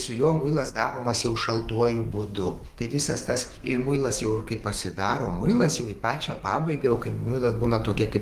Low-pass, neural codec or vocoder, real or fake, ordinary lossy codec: 14.4 kHz; codec, 32 kHz, 1.9 kbps, SNAC; fake; Opus, 32 kbps